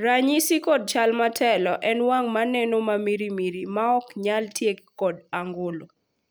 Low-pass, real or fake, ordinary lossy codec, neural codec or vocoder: none; real; none; none